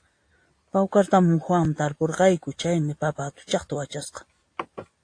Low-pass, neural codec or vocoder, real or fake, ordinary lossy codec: 9.9 kHz; none; real; AAC, 48 kbps